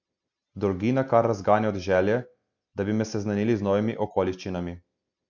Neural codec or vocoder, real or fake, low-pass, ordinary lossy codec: none; real; 7.2 kHz; none